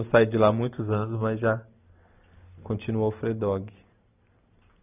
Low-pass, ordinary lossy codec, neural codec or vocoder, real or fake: 3.6 kHz; none; none; real